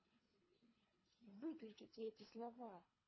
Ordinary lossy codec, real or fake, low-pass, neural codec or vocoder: MP3, 24 kbps; fake; 7.2 kHz; codec, 24 kHz, 3 kbps, HILCodec